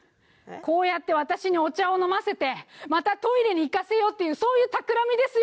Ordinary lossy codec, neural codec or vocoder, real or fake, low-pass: none; none; real; none